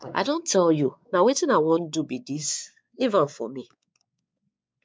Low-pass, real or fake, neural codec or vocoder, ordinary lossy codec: none; fake; codec, 16 kHz, 4 kbps, X-Codec, WavLM features, trained on Multilingual LibriSpeech; none